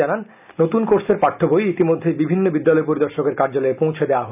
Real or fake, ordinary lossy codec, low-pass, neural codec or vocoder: real; none; 3.6 kHz; none